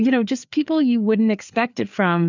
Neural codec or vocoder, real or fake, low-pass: codec, 16 kHz, 4 kbps, FreqCodec, larger model; fake; 7.2 kHz